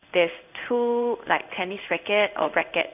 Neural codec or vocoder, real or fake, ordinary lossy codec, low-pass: codec, 16 kHz in and 24 kHz out, 1 kbps, XY-Tokenizer; fake; none; 3.6 kHz